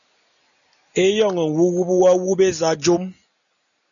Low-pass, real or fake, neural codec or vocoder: 7.2 kHz; real; none